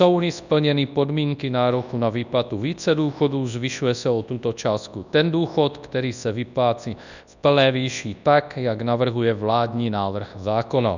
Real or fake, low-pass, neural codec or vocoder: fake; 7.2 kHz; codec, 24 kHz, 0.9 kbps, WavTokenizer, large speech release